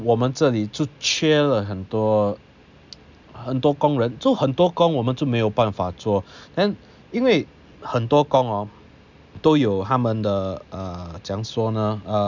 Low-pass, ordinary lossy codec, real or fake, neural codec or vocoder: 7.2 kHz; none; real; none